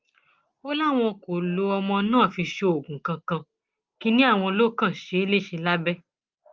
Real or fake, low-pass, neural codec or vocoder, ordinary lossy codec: real; 7.2 kHz; none; Opus, 32 kbps